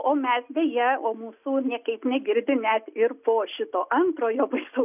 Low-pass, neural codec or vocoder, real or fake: 3.6 kHz; none; real